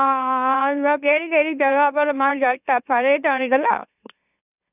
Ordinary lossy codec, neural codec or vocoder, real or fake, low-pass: none; autoencoder, 44.1 kHz, a latent of 192 numbers a frame, MeloTTS; fake; 3.6 kHz